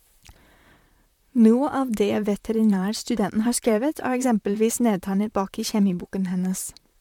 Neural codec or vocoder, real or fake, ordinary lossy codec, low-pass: vocoder, 44.1 kHz, 128 mel bands, Pupu-Vocoder; fake; MP3, 96 kbps; 19.8 kHz